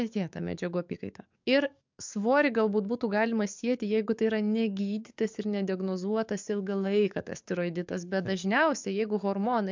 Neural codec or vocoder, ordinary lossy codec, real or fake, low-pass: codec, 44.1 kHz, 7.8 kbps, DAC; MP3, 64 kbps; fake; 7.2 kHz